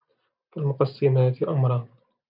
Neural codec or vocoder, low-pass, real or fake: none; 5.4 kHz; real